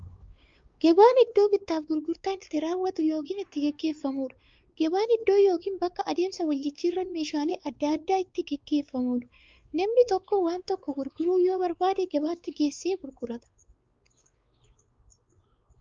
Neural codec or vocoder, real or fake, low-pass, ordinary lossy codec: codec, 16 kHz, 4 kbps, X-Codec, WavLM features, trained on Multilingual LibriSpeech; fake; 7.2 kHz; Opus, 32 kbps